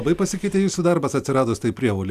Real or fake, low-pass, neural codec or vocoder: real; 14.4 kHz; none